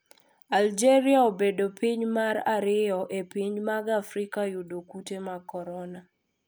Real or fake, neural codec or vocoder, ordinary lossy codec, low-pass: real; none; none; none